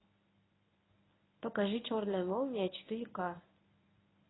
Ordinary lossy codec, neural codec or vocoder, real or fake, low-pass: AAC, 16 kbps; codec, 24 kHz, 0.9 kbps, WavTokenizer, medium speech release version 1; fake; 7.2 kHz